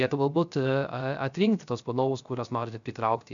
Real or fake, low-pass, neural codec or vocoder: fake; 7.2 kHz; codec, 16 kHz, 0.3 kbps, FocalCodec